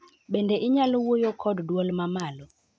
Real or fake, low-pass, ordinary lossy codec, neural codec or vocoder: real; none; none; none